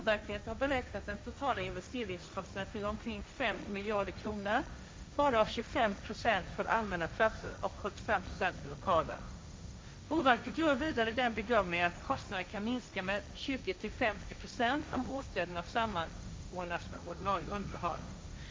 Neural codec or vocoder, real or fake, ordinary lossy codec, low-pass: codec, 16 kHz, 1.1 kbps, Voila-Tokenizer; fake; none; none